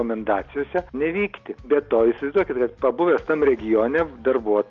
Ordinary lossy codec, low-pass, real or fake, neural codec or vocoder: Opus, 24 kbps; 7.2 kHz; real; none